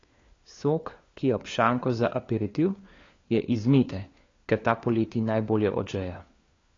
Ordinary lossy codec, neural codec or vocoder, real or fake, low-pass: AAC, 32 kbps; codec, 16 kHz, 4 kbps, FunCodec, trained on LibriTTS, 50 frames a second; fake; 7.2 kHz